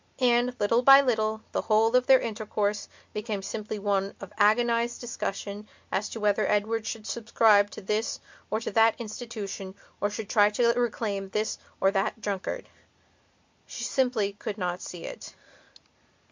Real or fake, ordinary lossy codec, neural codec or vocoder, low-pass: real; MP3, 64 kbps; none; 7.2 kHz